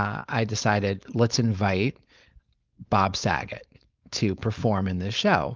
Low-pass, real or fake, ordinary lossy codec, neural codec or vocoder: 7.2 kHz; fake; Opus, 24 kbps; codec, 16 kHz, 4.8 kbps, FACodec